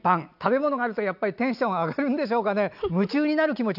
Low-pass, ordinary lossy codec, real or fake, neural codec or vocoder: 5.4 kHz; none; real; none